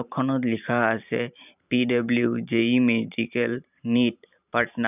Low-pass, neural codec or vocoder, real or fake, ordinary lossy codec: 3.6 kHz; vocoder, 44.1 kHz, 128 mel bands every 512 samples, BigVGAN v2; fake; none